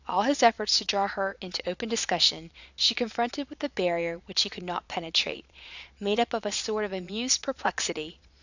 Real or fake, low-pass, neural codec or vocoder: fake; 7.2 kHz; vocoder, 22.05 kHz, 80 mel bands, Vocos